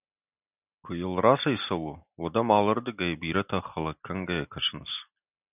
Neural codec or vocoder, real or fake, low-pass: none; real; 3.6 kHz